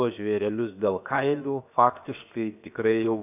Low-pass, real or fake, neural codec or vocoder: 3.6 kHz; fake; codec, 16 kHz, 0.7 kbps, FocalCodec